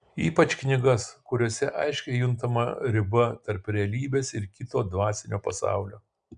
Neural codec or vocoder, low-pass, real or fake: none; 9.9 kHz; real